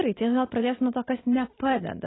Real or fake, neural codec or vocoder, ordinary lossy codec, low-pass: real; none; AAC, 16 kbps; 7.2 kHz